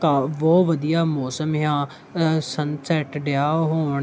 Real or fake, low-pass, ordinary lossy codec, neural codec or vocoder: real; none; none; none